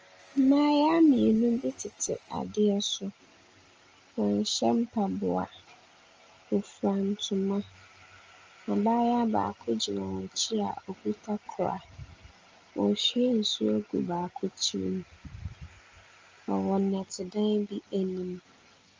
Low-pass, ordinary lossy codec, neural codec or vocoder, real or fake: 7.2 kHz; Opus, 24 kbps; none; real